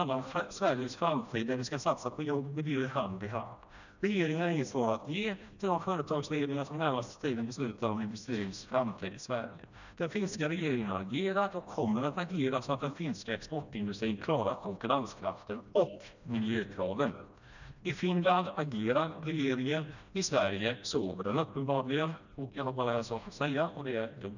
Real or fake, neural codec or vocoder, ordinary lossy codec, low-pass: fake; codec, 16 kHz, 1 kbps, FreqCodec, smaller model; none; 7.2 kHz